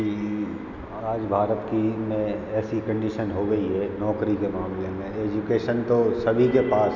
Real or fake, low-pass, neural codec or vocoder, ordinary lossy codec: real; 7.2 kHz; none; none